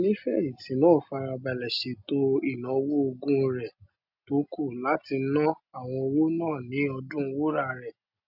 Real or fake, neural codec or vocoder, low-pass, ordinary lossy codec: real; none; 5.4 kHz; none